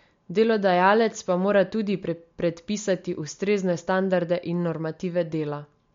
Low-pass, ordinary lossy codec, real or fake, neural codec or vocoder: 7.2 kHz; MP3, 48 kbps; real; none